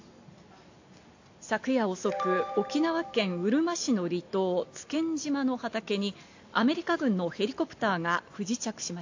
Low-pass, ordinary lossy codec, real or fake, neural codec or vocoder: 7.2 kHz; AAC, 48 kbps; real; none